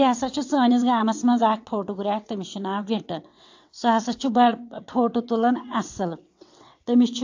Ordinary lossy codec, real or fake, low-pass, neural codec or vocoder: AAC, 48 kbps; fake; 7.2 kHz; vocoder, 22.05 kHz, 80 mel bands, WaveNeXt